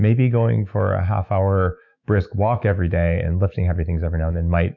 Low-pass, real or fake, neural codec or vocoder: 7.2 kHz; fake; autoencoder, 48 kHz, 128 numbers a frame, DAC-VAE, trained on Japanese speech